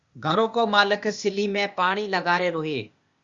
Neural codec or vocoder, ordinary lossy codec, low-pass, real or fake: codec, 16 kHz, 0.8 kbps, ZipCodec; Opus, 64 kbps; 7.2 kHz; fake